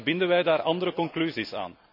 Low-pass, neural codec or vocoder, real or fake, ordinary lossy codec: 5.4 kHz; none; real; none